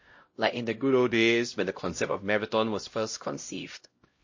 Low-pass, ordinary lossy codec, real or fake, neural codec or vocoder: 7.2 kHz; MP3, 32 kbps; fake; codec, 16 kHz, 0.5 kbps, X-Codec, HuBERT features, trained on LibriSpeech